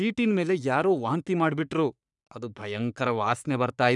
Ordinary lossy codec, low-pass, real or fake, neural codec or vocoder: none; 10.8 kHz; fake; codec, 44.1 kHz, 3.4 kbps, Pupu-Codec